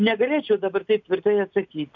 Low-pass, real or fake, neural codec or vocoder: 7.2 kHz; real; none